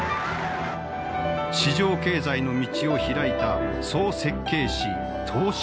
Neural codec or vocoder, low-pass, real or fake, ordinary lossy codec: none; none; real; none